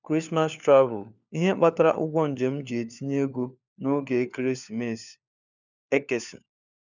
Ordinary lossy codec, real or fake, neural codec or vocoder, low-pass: none; fake; codec, 16 kHz, 4 kbps, FunCodec, trained on LibriTTS, 50 frames a second; 7.2 kHz